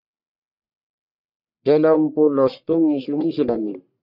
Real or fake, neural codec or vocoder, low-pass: fake; codec, 44.1 kHz, 1.7 kbps, Pupu-Codec; 5.4 kHz